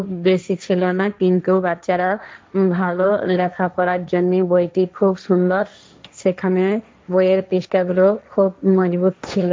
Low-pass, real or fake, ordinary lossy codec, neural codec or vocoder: none; fake; none; codec, 16 kHz, 1.1 kbps, Voila-Tokenizer